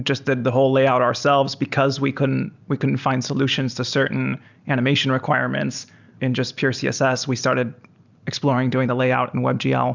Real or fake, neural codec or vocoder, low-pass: real; none; 7.2 kHz